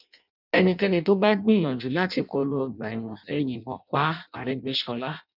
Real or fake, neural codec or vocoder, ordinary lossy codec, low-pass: fake; codec, 16 kHz in and 24 kHz out, 0.6 kbps, FireRedTTS-2 codec; none; 5.4 kHz